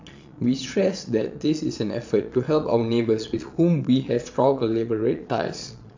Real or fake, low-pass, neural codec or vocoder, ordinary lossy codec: fake; 7.2 kHz; vocoder, 22.05 kHz, 80 mel bands, Vocos; AAC, 48 kbps